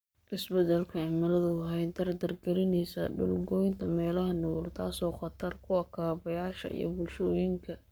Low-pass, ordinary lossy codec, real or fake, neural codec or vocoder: none; none; fake; codec, 44.1 kHz, 7.8 kbps, Pupu-Codec